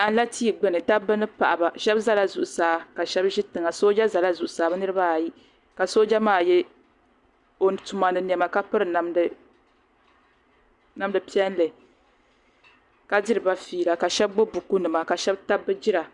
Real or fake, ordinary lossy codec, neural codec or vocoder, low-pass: real; Opus, 64 kbps; none; 10.8 kHz